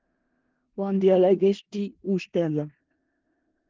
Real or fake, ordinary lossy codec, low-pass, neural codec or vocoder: fake; Opus, 16 kbps; 7.2 kHz; codec, 16 kHz in and 24 kHz out, 0.4 kbps, LongCat-Audio-Codec, four codebook decoder